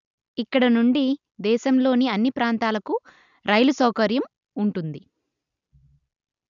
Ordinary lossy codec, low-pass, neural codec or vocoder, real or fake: none; 7.2 kHz; none; real